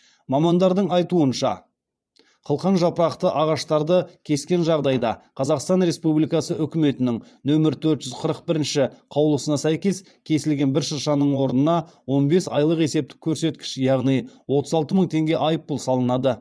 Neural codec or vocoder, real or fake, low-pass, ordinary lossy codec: vocoder, 22.05 kHz, 80 mel bands, Vocos; fake; none; none